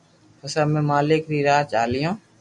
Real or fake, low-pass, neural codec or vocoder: real; 10.8 kHz; none